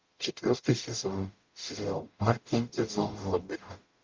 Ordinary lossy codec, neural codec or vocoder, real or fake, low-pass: Opus, 24 kbps; codec, 44.1 kHz, 0.9 kbps, DAC; fake; 7.2 kHz